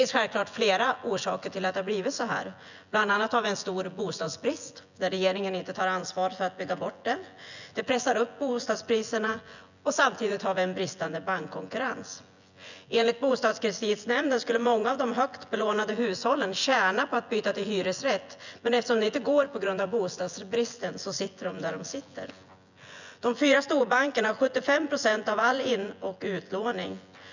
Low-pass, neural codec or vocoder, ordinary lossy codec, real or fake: 7.2 kHz; vocoder, 24 kHz, 100 mel bands, Vocos; none; fake